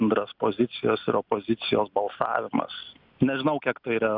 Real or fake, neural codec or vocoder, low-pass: real; none; 5.4 kHz